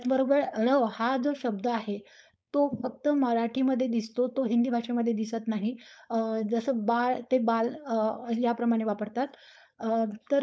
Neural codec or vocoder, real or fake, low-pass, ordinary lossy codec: codec, 16 kHz, 4.8 kbps, FACodec; fake; none; none